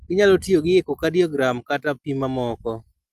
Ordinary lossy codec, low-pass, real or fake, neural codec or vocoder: Opus, 32 kbps; 14.4 kHz; real; none